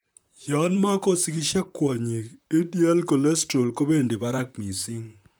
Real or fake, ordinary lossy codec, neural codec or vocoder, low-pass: fake; none; vocoder, 44.1 kHz, 128 mel bands, Pupu-Vocoder; none